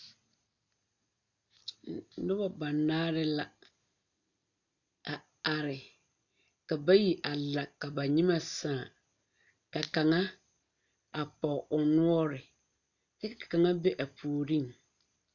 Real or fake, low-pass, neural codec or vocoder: real; 7.2 kHz; none